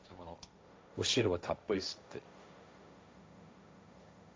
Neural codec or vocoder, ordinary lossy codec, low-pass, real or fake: codec, 16 kHz, 1.1 kbps, Voila-Tokenizer; none; none; fake